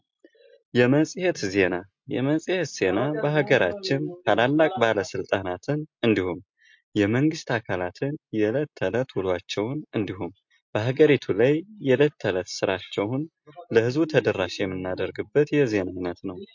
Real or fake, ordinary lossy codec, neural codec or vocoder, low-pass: real; MP3, 48 kbps; none; 7.2 kHz